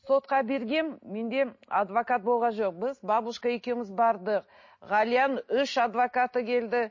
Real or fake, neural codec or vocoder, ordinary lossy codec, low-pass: real; none; MP3, 32 kbps; 7.2 kHz